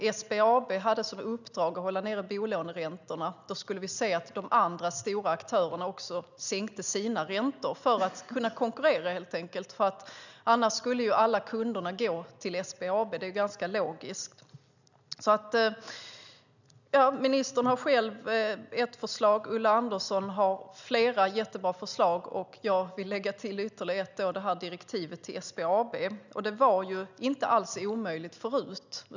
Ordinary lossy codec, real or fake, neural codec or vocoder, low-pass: none; real; none; 7.2 kHz